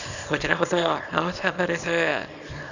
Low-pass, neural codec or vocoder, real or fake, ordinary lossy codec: 7.2 kHz; codec, 24 kHz, 0.9 kbps, WavTokenizer, small release; fake; none